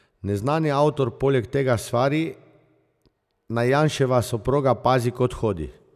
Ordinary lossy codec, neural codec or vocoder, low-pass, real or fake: none; none; 14.4 kHz; real